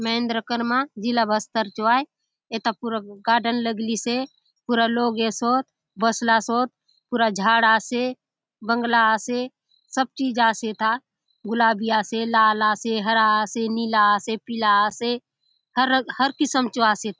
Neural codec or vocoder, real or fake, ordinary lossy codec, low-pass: none; real; none; none